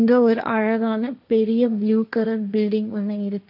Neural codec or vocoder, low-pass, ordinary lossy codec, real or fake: codec, 16 kHz, 1.1 kbps, Voila-Tokenizer; 5.4 kHz; none; fake